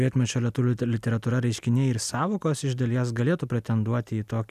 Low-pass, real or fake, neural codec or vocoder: 14.4 kHz; real; none